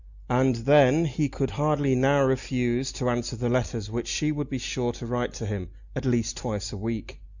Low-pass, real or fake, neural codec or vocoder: 7.2 kHz; real; none